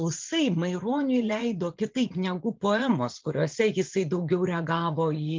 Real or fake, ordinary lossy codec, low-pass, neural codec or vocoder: fake; Opus, 24 kbps; 7.2 kHz; vocoder, 44.1 kHz, 80 mel bands, Vocos